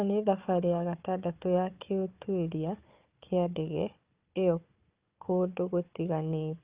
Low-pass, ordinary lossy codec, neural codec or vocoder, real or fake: 3.6 kHz; Opus, 24 kbps; codec, 16 kHz, 4 kbps, FunCodec, trained on Chinese and English, 50 frames a second; fake